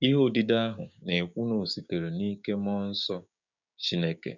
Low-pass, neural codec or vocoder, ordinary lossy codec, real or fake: 7.2 kHz; codec, 16 kHz, 6 kbps, DAC; none; fake